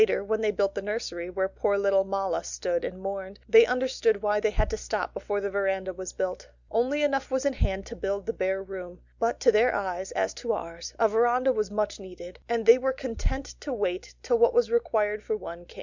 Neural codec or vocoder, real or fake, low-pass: none; real; 7.2 kHz